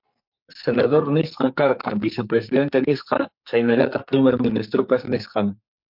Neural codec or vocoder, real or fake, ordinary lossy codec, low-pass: codec, 44.1 kHz, 2.6 kbps, SNAC; fake; MP3, 48 kbps; 5.4 kHz